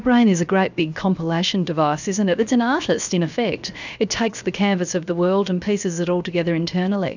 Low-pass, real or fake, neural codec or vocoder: 7.2 kHz; fake; codec, 16 kHz, 0.7 kbps, FocalCodec